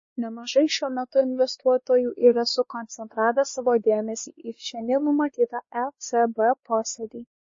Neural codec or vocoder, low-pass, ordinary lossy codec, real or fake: codec, 16 kHz, 2 kbps, X-Codec, WavLM features, trained on Multilingual LibriSpeech; 7.2 kHz; MP3, 32 kbps; fake